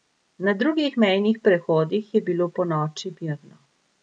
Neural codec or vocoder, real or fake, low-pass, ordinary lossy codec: none; real; 9.9 kHz; none